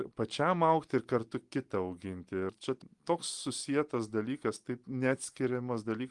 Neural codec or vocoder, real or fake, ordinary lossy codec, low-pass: none; real; Opus, 24 kbps; 10.8 kHz